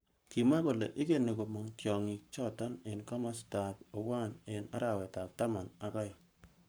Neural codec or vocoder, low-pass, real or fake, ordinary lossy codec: codec, 44.1 kHz, 7.8 kbps, Pupu-Codec; none; fake; none